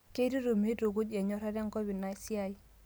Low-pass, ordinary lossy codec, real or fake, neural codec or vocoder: none; none; real; none